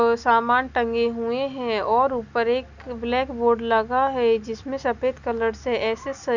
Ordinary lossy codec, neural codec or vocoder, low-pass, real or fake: none; none; 7.2 kHz; real